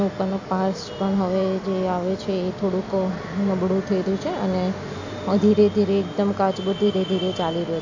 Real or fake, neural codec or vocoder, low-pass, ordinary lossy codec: real; none; 7.2 kHz; AAC, 48 kbps